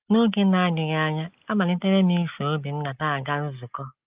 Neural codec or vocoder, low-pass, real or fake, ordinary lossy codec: none; 3.6 kHz; real; Opus, 32 kbps